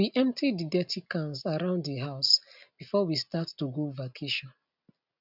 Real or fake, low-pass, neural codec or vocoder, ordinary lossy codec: real; 5.4 kHz; none; none